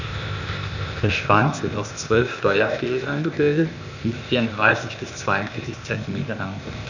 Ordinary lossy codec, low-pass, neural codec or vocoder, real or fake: none; 7.2 kHz; codec, 16 kHz, 0.8 kbps, ZipCodec; fake